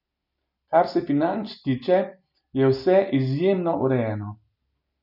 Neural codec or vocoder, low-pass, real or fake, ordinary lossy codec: none; 5.4 kHz; real; none